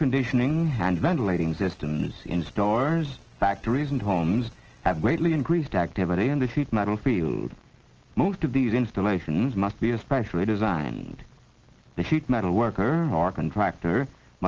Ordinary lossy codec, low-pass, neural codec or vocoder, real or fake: Opus, 16 kbps; 7.2 kHz; none; real